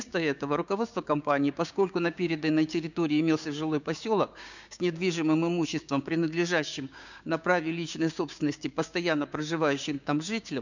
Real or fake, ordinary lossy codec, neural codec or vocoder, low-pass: fake; none; codec, 16 kHz, 6 kbps, DAC; 7.2 kHz